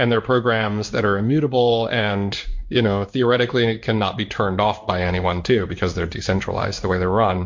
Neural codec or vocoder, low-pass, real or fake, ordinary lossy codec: codec, 16 kHz in and 24 kHz out, 1 kbps, XY-Tokenizer; 7.2 kHz; fake; MP3, 48 kbps